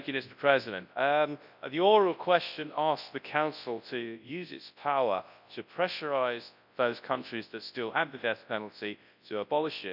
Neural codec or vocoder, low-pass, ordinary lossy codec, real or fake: codec, 24 kHz, 0.9 kbps, WavTokenizer, large speech release; 5.4 kHz; none; fake